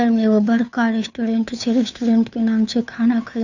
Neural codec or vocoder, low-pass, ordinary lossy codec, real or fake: codec, 16 kHz, 2 kbps, FunCodec, trained on Chinese and English, 25 frames a second; 7.2 kHz; none; fake